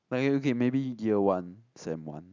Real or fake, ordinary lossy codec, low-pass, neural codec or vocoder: real; none; 7.2 kHz; none